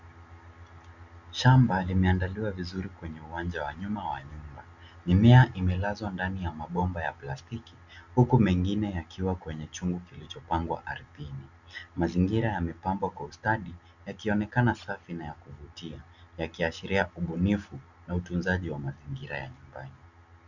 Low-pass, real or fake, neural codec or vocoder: 7.2 kHz; real; none